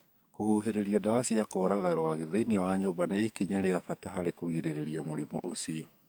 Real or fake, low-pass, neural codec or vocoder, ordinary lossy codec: fake; none; codec, 44.1 kHz, 2.6 kbps, SNAC; none